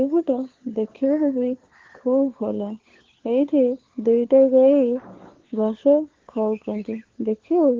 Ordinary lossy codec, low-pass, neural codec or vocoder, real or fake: Opus, 16 kbps; 7.2 kHz; codec, 16 kHz, 4 kbps, FunCodec, trained on LibriTTS, 50 frames a second; fake